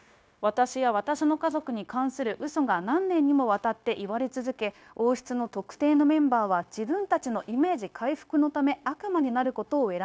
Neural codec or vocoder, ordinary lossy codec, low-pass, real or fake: codec, 16 kHz, 0.9 kbps, LongCat-Audio-Codec; none; none; fake